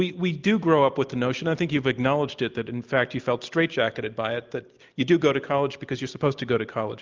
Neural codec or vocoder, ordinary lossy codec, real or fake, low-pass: none; Opus, 32 kbps; real; 7.2 kHz